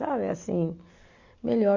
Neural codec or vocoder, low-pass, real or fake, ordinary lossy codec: none; 7.2 kHz; real; Opus, 64 kbps